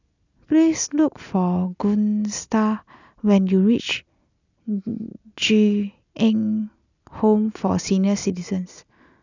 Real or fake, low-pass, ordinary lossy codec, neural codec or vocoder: real; 7.2 kHz; none; none